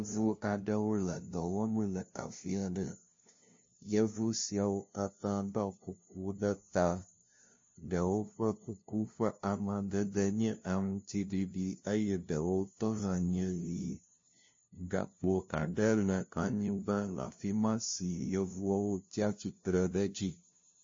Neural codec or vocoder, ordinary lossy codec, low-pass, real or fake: codec, 16 kHz, 0.5 kbps, FunCodec, trained on LibriTTS, 25 frames a second; MP3, 32 kbps; 7.2 kHz; fake